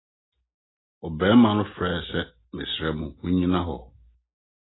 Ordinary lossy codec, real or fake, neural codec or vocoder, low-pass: AAC, 16 kbps; real; none; 7.2 kHz